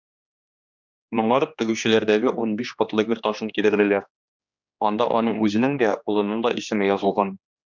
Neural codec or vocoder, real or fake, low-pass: codec, 16 kHz, 2 kbps, X-Codec, HuBERT features, trained on general audio; fake; 7.2 kHz